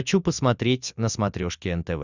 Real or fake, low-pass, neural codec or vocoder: real; 7.2 kHz; none